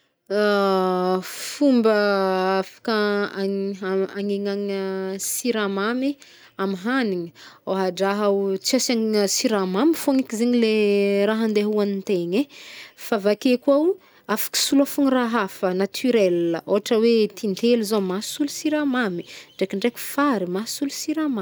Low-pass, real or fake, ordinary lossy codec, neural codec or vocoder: none; real; none; none